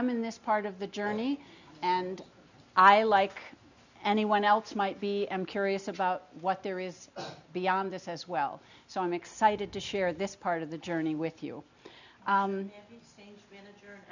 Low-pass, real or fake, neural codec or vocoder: 7.2 kHz; real; none